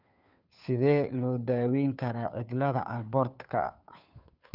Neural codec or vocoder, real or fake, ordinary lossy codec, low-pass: codec, 16 kHz, 2 kbps, FunCodec, trained on Chinese and English, 25 frames a second; fake; none; 5.4 kHz